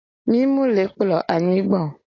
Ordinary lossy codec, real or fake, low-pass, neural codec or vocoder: AAC, 32 kbps; real; 7.2 kHz; none